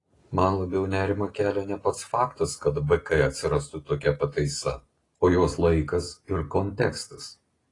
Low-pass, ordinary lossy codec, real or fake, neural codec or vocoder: 10.8 kHz; AAC, 32 kbps; real; none